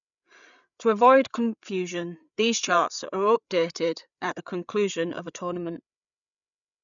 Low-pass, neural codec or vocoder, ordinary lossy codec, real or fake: 7.2 kHz; codec, 16 kHz, 8 kbps, FreqCodec, larger model; none; fake